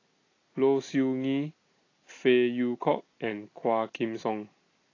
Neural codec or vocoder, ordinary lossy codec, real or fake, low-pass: none; AAC, 32 kbps; real; 7.2 kHz